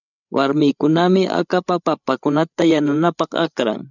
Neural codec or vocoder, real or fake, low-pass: codec, 16 kHz, 8 kbps, FreqCodec, larger model; fake; 7.2 kHz